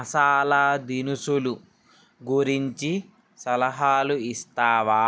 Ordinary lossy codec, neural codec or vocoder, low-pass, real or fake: none; none; none; real